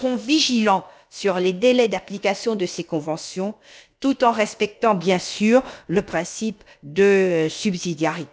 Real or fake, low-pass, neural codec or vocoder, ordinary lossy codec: fake; none; codec, 16 kHz, about 1 kbps, DyCAST, with the encoder's durations; none